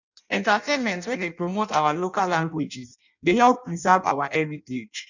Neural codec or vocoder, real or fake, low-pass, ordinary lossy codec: codec, 16 kHz in and 24 kHz out, 0.6 kbps, FireRedTTS-2 codec; fake; 7.2 kHz; none